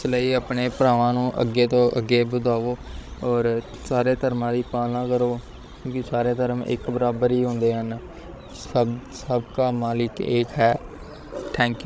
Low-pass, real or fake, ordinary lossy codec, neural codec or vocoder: none; fake; none; codec, 16 kHz, 16 kbps, FreqCodec, larger model